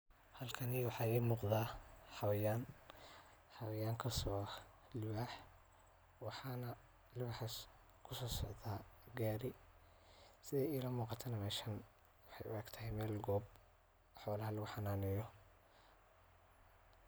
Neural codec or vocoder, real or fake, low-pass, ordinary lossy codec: none; real; none; none